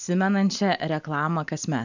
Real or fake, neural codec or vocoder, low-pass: real; none; 7.2 kHz